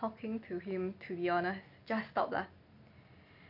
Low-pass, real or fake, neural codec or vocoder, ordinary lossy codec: 5.4 kHz; real; none; none